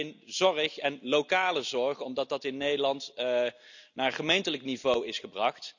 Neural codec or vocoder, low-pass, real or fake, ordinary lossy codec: none; 7.2 kHz; real; none